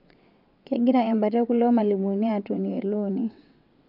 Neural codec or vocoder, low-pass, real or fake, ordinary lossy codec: vocoder, 22.05 kHz, 80 mel bands, WaveNeXt; 5.4 kHz; fake; none